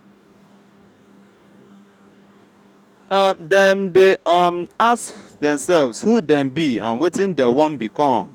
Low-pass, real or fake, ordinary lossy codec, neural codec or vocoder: 19.8 kHz; fake; none; codec, 44.1 kHz, 2.6 kbps, DAC